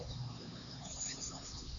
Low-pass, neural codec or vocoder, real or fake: 7.2 kHz; codec, 16 kHz, 2 kbps, X-Codec, HuBERT features, trained on LibriSpeech; fake